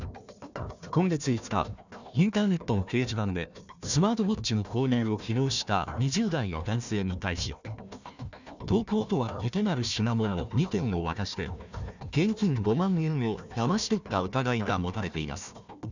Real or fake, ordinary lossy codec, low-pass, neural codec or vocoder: fake; none; 7.2 kHz; codec, 16 kHz, 1 kbps, FunCodec, trained on Chinese and English, 50 frames a second